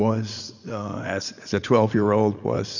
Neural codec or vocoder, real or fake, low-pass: vocoder, 44.1 kHz, 80 mel bands, Vocos; fake; 7.2 kHz